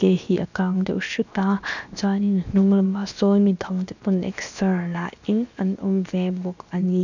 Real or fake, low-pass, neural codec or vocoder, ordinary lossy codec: fake; 7.2 kHz; codec, 16 kHz, 0.7 kbps, FocalCodec; none